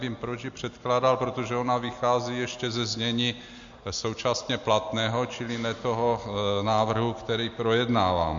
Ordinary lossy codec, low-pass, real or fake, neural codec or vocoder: MP3, 48 kbps; 7.2 kHz; real; none